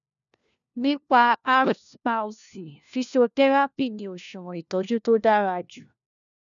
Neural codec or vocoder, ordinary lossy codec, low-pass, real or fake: codec, 16 kHz, 1 kbps, FunCodec, trained on LibriTTS, 50 frames a second; none; 7.2 kHz; fake